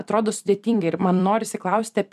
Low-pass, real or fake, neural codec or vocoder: 14.4 kHz; fake; vocoder, 44.1 kHz, 128 mel bands every 256 samples, BigVGAN v2